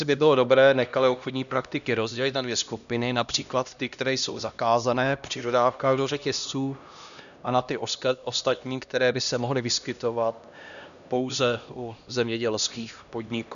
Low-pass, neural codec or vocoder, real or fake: 7.2 kHz; codec, 16 kHz, 1 kbps, X-Codec, HuBERT features, trained on LibriSpeech; fake